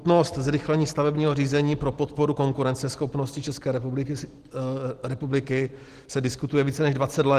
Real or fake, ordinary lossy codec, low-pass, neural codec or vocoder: real; Opus, 16 kbps; 14.4 kHz; none